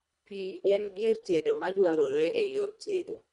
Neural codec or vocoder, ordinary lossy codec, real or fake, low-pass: codec, 24 kHz, 1.5 kbps, HILCodec; none; fake; 10.8 kHz